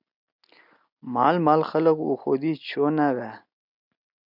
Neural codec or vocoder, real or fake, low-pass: none; real; 5.4 kHz